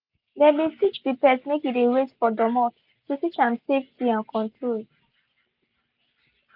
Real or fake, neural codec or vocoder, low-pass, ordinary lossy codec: real; none; 5.4 kHz; none